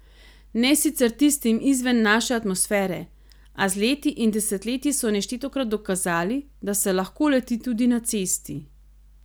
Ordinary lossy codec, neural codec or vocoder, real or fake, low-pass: none; none; real; none